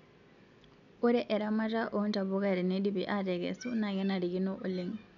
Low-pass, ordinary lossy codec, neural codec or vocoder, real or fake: 7.2 kHz; none; none; real